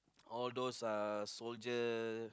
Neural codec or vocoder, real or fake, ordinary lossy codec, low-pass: none; real; none; none